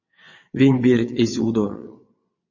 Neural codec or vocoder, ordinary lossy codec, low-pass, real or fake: vocoder, 22.05 kHz, 80 mel bands, WaveNeXt; MP3, 32 kbps; 7.2 kHz; fake